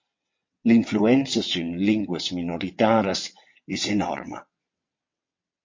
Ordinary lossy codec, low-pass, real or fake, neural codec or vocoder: MP3, 32 kbps; 7.2 kHz; fake; vocoder, 22.05 kHz, 80 mel bands, WaveNeXt